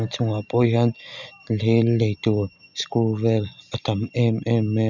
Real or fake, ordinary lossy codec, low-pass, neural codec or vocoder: real; none; 7.2 kHz; none